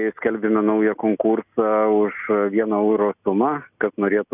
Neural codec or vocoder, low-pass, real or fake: none; 3.6 kHz; real